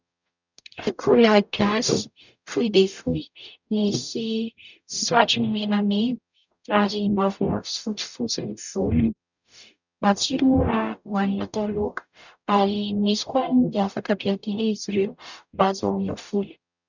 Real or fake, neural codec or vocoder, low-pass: fake; codec, 44.1 kHz, 0.9 kbps, DAC; 7.2 kHz